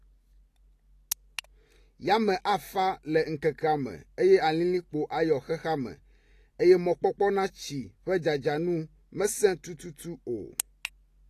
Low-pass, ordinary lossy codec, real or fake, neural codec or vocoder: 14.4 kHz; AAC, 48 kbps; real; none